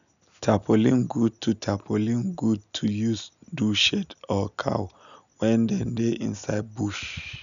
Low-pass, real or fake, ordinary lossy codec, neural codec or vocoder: 7.2 kHz; real; none; none